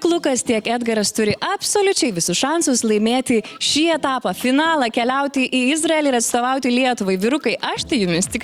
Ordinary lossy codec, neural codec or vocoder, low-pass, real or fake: Opus, 64 kbps; none; 19.8 kHz; real